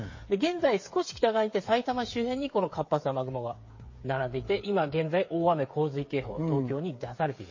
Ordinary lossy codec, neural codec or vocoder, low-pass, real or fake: MP3, 32 kbps; codec, 16 kHz, 8 kbps, FreqCodec, smaller model; 7.2 kHz; fake